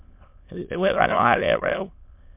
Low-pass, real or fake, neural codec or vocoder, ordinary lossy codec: 3.6 kHz; fake; autoencoder, 22.05 kHz, a latent of 192 numbers a frame, VITS, trained on many speakers; MP3, 32 kbps